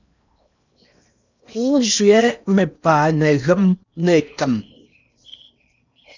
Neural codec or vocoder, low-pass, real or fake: codec, 16 kHz in and 24 kHz out, 0.8 kbps, FocalCodec, streaming, 65536 codes; 7.2 kHz; fake